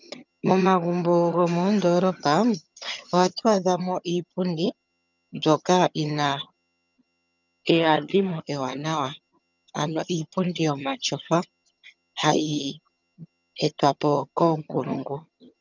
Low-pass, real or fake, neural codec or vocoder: 7.2 kHz; fake; vocoder, 22.05 kHz, 80 mel bands, HiFi-GAN